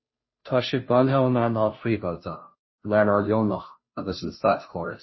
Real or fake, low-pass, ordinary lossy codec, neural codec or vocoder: fake; 7.2 kHz; MP3, 24 kbps; codec, 16 kHz, 0.5 kbps, FunCodec, trained on Chinese and English, 25 frames a second